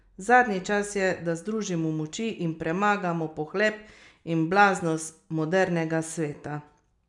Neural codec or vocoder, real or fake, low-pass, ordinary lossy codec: none; real; 10.8 kHz; none